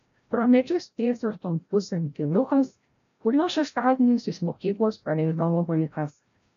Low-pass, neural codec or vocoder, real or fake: 7.2 kHz; codec, 16 kHz, 0.5 kbps, FreqCodec, larger model; fake